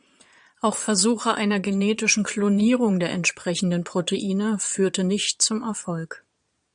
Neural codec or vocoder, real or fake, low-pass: vocoder, 22.05 kHz, 80 mel bands, Vocos; fake; 9.9 kHz